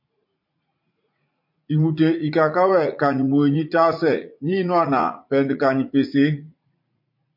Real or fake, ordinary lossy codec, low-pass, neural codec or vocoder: fake; MP3, 32 kbps; 5.4 kHz; vocoder, 44.1 kHz, 80 mel bands, Vocos